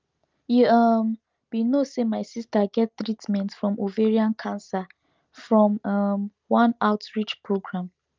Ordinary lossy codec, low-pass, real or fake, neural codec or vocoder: Opus, 24 kbps; 7.2 kHz; real; none